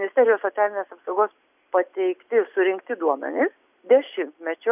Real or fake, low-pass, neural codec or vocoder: real; 3.6 kHz; none